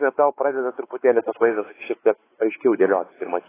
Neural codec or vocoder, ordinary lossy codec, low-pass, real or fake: codec, 16 kHz, 4 kbps, X-Codec, WavLM features, trained on Multilingual LibriSpeech; AAC, 16 kbps; 3.6 kHz; fake